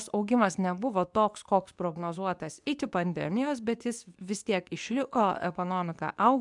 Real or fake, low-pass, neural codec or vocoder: fake; 10.8 kHz; codec, 24 kHz, 0.9 kbps, WavTokenizer, medium speech release version 2